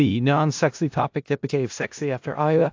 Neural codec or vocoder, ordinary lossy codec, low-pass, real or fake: codec, 16 kHz in and 24 kHz out, 0.4 kbps, LongCat-Audio-Codec, four codebook decoder; AAC, 48 kbps; 7.2 kHz; fake